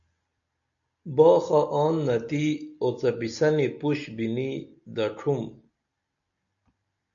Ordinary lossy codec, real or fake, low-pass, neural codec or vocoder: AAC, 64 kbps; real; 7.2 kHz; none